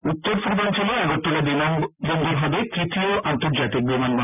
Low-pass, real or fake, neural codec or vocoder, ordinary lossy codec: 3.6 kHz; real; none; none